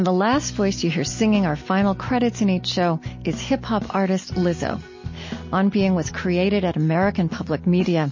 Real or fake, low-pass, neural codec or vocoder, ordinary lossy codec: real; 7.2 kHz; none; MP3, 32 kbps